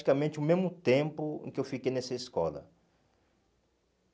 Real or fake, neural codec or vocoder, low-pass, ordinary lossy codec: real; none; none; none